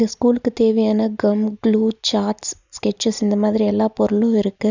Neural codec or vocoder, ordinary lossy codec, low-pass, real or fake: none; none; 7.2 kHz; real